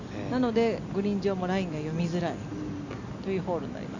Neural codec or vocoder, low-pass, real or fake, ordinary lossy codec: none; 7.2 kHz; real; none